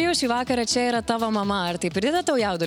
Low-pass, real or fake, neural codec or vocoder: 19.8 kHz; real; none